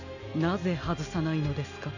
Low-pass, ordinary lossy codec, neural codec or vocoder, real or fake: 7.2 kHz; none; none; real